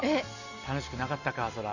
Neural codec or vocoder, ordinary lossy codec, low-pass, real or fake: none; none; 7.2 kHz; real